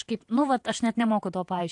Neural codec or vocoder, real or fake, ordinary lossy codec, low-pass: vocoder, 48 kHz, 128 mel bands, Vocos; fake; AAC, 64 kbps; 10.8 kHz